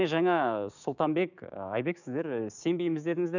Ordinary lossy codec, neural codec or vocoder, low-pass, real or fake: none; codec, 16 kHz, 6 kbps, DAC; 7.2 kHz; fake